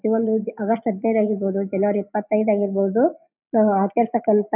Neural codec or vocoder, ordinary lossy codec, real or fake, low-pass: none; none; real; 3.6 kHz